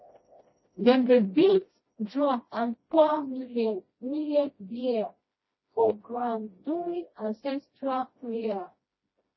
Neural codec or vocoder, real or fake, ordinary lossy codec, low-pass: codec, 16 kHz, 1 kbps, FreqCodec, smaller model; fake; MP3, 24 kbps; 7.2 kHz